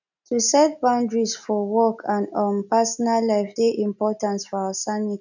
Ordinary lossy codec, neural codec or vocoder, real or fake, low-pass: none; none; real; 7.2 kHz